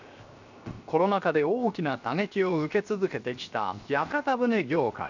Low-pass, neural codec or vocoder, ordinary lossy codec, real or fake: 7.2 kHz; codec, 16 kHz, 0.7 kbps, FocalCodec; none; fake